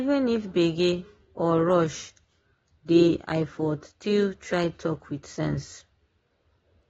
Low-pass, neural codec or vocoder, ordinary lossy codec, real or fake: 19.8 kHz; none; AAC, 24 kbps; real